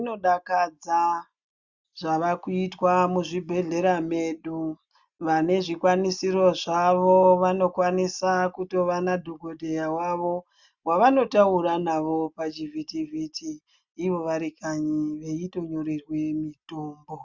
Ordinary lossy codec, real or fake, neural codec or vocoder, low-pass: Opus, 64 kbps; real; none; 7.2 kHz